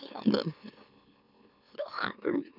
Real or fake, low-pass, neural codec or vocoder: fake; 5.4 kHz; autoencoder, 44.1 kHz, a latent of 192 numbers a frame, MeloTTS